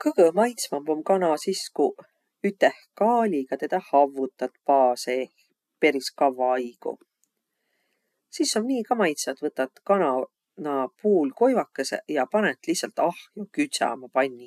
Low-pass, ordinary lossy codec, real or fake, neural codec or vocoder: 14.4 kHz; none; real; none